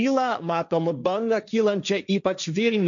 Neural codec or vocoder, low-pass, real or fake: codec, 16 kHz, 1.1 kbps, Voila-Tokenizer; 7.2 kHz; fake